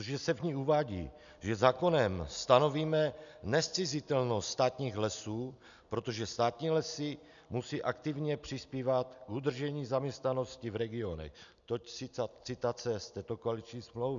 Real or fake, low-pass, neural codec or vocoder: real; 7.2 kHz; none